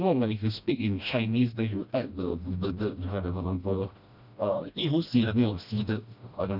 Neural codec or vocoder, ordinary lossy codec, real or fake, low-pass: codec, 16 kHz, 1 kbps, FreqCodec, smaller model; none; fake; 5.4 kHz